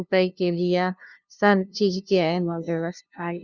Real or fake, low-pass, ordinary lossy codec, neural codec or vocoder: fake; 7.2 kHz; Opus, 64 kbps; codec, 16 kHz, 0.5 kbps, FunCodec, trained on LibriTTS, 25 frames a second